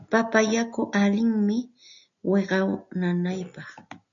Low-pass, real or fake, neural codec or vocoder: 7.2 kHz; real; none